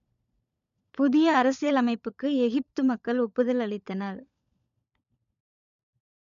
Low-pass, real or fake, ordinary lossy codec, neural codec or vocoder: 7.2 kHz; fake; none; codec, 16 kHz, 16 kbps, FunCodec, trained on LibriTTS, 50 frames a second